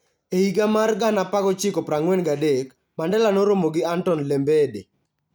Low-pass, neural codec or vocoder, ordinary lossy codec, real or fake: none; none; none; real